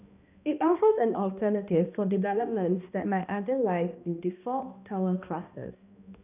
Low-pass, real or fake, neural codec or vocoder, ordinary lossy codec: 3.6 kHz; fake; codec, 16 kHz, 1 kbps, X-Codec, HuBERT features, trained on balanced general audio; none